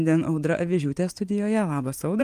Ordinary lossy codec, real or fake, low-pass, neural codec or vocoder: Opus, 32 kbps; fake; 14.4 kHz; vocoder, 44.1 kHz, 128 mel bands every 512 samples, BigVGAN v2